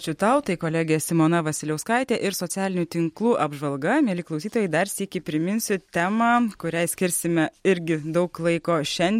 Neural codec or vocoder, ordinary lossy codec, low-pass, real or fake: none; MP3, 64 kbps; 19.8 kHz; real